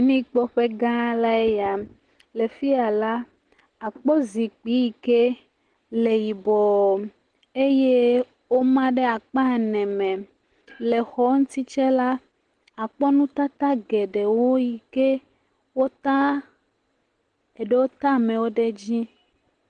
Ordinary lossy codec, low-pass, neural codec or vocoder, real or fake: Opus, 16 kbps; 9.9 kHz; none; real